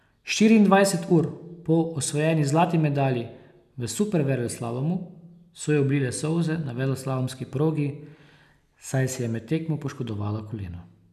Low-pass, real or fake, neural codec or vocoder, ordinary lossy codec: 14.4 kHz; real; none; none